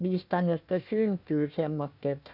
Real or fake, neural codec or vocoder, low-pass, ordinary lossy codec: fake; codec, 16 kHz, 1 kbps, FunCodec, trained on Chinese and English, 50 frames a second; 5.4 kHz; none